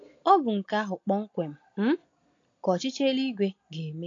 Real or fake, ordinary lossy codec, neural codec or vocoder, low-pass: real; AAC, 48 kbps; none; 7.2 kHz